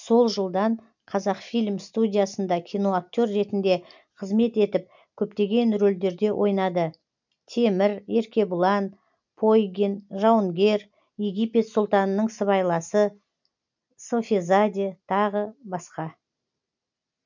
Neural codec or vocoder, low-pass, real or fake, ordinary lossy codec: none; 7.2 kHz; real; none